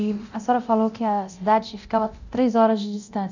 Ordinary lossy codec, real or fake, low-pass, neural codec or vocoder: none; fake; 7.2 kHz; codec, 24 kHz, 0.9 kbps, DualCodec